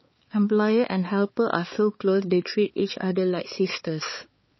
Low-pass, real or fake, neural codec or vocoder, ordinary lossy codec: 7.2 kHz; fake; codec, 16 kHz, 4 kbps, X-Codec, HuBERT features, trained on balanced general audio; MP3, 24 kbps